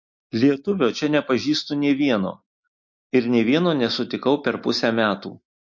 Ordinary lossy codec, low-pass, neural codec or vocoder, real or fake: MP3, 48 kbps; 7.2 kHz; none; real